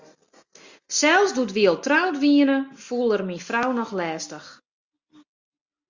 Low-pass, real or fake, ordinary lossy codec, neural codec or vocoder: 7.2 kHz; real; Opus, 64 kbps; none